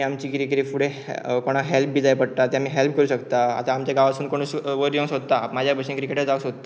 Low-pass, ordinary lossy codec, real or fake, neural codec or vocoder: none; none; real; none